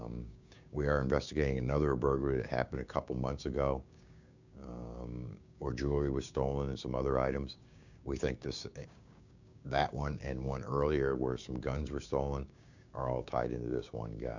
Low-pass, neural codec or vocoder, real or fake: 7.2 kHz; codec, 16 kHz, 6 kbps, DAC; fake